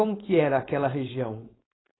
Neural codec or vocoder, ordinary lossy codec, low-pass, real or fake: codec, 16 kHz, 4.8 kbps, FACodec; AAC, 16 kbps; 7.2 kHz; fake